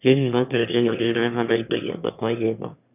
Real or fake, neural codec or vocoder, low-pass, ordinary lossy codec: fake; autoencoder, 22.05 kHz, a latent of 192 numbers a frame, VITS, trained on one speaker; 3.6 kHz; none